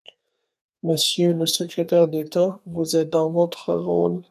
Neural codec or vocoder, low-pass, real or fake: codec, 32 kHz, 1.9 kbps, SNAC; 14.4 kHz; fake